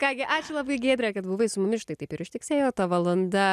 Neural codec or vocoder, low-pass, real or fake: none; 14.4 kHz; real